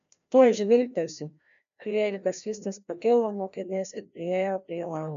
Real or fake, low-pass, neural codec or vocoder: fake; 7.2 kHz; codec, 16 kHz, 1 kbps, FreqCodec, larger model